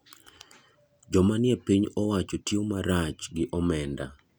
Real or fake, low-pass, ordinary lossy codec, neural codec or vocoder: real; none; none; none